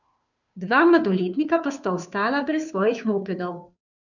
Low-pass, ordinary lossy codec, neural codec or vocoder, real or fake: 7.2 kHz; none; codec, 16 kHz, 2 kbps, FunCodec, trained on Chinese and English, 25 frames a second; fake